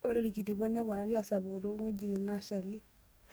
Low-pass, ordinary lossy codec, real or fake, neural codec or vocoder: none; none; fake; codec, 44.1 kHz, 2.6 kbps, DAC